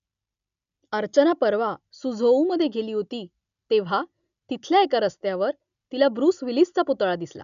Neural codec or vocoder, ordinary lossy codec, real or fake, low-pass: none; none; real; 7.2 kHz